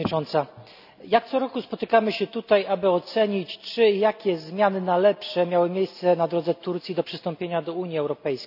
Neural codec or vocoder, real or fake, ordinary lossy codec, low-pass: none; real; none; 5.4 kHz